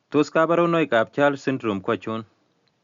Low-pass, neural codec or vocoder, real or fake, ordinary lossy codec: 7.2 kHz; none; real; Opus, 64 kbps